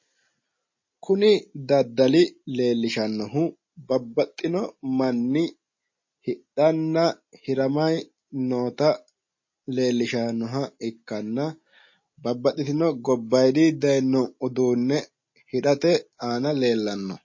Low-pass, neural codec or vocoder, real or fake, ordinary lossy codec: 7.2 kHz; none; real; MP3, 32 kbps